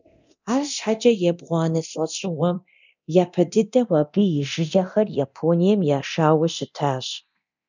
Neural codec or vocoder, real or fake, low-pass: codec, 24 kHz, 0.9 kbps, DualCodec; fake; 7.2 kHz